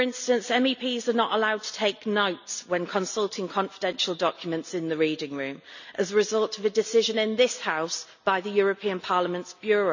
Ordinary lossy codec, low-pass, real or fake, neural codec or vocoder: none; 7.2 kHz; real; none